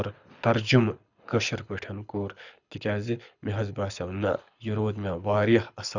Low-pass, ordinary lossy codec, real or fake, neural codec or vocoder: 7.2 kHz; none; fake; codec, 24 kHz, 6 kbps, HILCodec